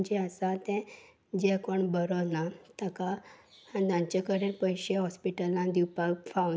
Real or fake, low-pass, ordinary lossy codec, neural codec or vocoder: real; none; none; none